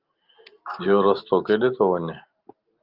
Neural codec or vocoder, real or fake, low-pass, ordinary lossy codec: none; real; 5.4 kHz; Opus, 32 kbps